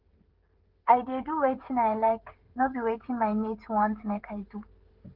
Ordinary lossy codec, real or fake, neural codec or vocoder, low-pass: Opus, 16 kbps; fake; codec, 16 kHz, 16 kbps, FreqCodec, smaller model; 5.4 kHz